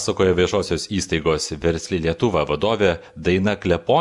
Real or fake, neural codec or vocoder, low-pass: real; none; 10.8 kHz